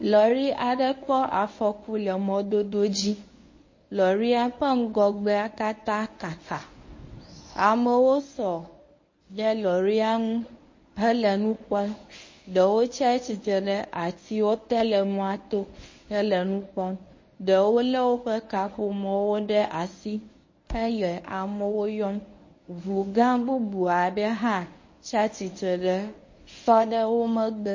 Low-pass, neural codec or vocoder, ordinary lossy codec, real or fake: 7.2 kHz; codec, 24 kHz, 0.9 kbps, WavTokenizer, medium speech release version 1; MP3, 32 kbps; fake